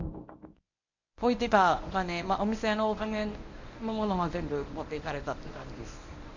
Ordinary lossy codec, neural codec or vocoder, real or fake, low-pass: none; codec, 24 kHz, 0.9 kbps, WavTokenizer, medium speech release version 1; fake; 7.2 kHz